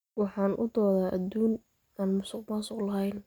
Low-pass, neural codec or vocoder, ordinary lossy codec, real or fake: none; none; none; real